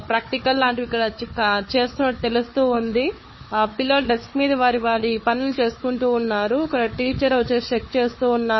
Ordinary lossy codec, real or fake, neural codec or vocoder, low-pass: MP3, 24 kbps; fake; codec, 16 kHz, 4 kbps, FunCodec, trained on Chinese and English, 50 frames a second; 7.2 kHz